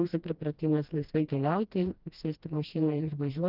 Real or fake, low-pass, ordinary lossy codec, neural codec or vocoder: fake; 5.4 kHz; Opus, 32 kbps; codec, 16 kHz, 1 kbps, FreqCodec, smaller model